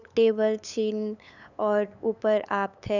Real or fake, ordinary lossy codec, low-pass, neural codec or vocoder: fake; none; 7.2 kHz; codec, 16 kHz, 8 kbps, FunCodec, trained on LibriTTS, 25 frames a second